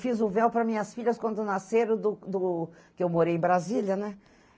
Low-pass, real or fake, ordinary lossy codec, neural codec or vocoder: none; real; none; none